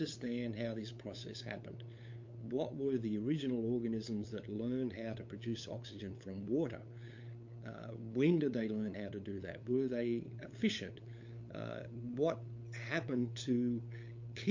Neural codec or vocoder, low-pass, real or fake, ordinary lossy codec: codec, 16 kHz, 8 kbps, FunCodec, trained on LibriTTS, 25 frames a second; 7.2 kHz; fake; MP3, 48 kbps